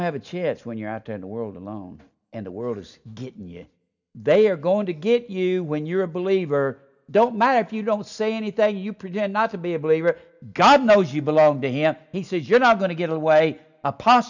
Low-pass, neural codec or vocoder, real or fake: 7.2 kHz; none; real